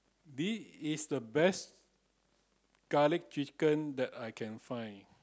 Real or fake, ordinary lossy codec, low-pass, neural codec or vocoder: real; none; none; none